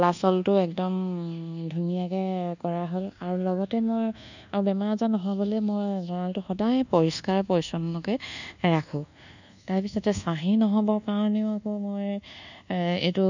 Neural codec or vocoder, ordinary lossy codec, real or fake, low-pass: codec, 24 kHz, 1.2 kbps, DualCodec; none; fake; 7.2 kHz